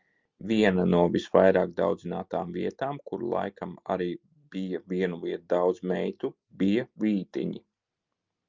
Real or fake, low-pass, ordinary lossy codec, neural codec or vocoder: real; 7.2 kHz; Opus, 24 kbps; none